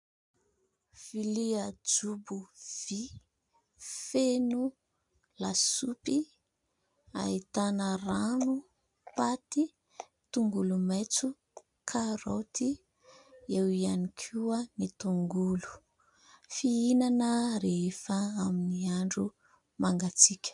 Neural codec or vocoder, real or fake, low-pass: none; real; 10.8 kHz